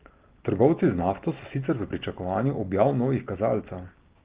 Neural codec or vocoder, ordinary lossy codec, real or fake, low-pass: none; Opus, 16 kbps; real; 3.6 kHz